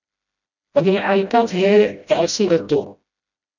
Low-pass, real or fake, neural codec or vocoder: 7.2 kHz; fake; codec, 16 kHz, 0.5 kbps, FreqCodec, smaller model